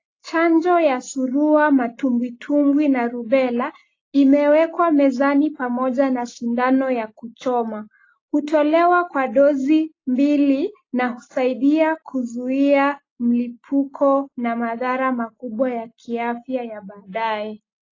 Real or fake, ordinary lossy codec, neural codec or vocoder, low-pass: real; AAC, 32 kbps; none; 7.2 kHz